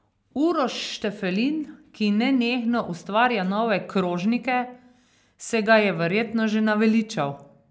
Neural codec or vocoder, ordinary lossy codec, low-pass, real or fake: none; none; none; real